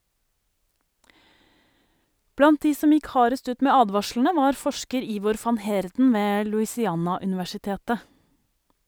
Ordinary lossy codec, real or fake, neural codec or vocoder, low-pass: none; real; none; none